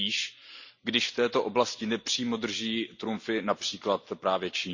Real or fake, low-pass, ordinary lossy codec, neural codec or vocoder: real; 7.2 kHz; Opus, 64 kbps; none